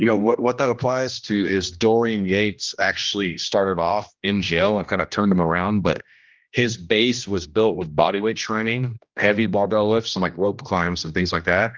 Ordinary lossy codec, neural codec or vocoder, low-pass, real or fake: Opus, 32 kbps; codec, 16 kHz, 1 kbps, X-Codec, HuBERT features, trained on general audio; 7.2 kHz; fake